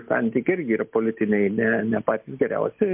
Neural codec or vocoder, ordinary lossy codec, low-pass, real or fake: none; MP3, 32 kbps; 3.6 kHz; real